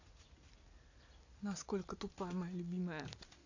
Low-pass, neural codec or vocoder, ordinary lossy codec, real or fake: 7.2 kHz; none; AAC, 48 kbps; real